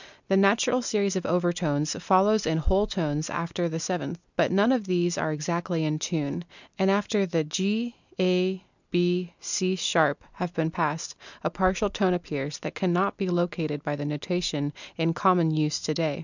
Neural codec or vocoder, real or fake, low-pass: none; real; 7.2 kHz